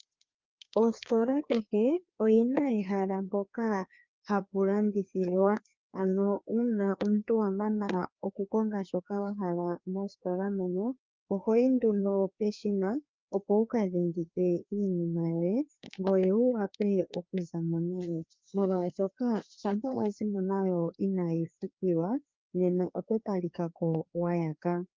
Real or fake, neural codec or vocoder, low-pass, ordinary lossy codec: fake; codec, 16 kHz, 2 kbps, FreqCodec, larger model; 7.2 kHz; Opus, 24 kbps